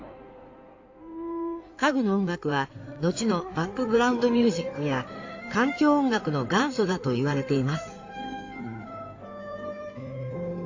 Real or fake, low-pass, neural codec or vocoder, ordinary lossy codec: fake; 7.2 kHz; codec, 16 kHz in and 24 kHz out, 2.2 kbps, FireRedTTS-2 codec; AAC, 48 kbps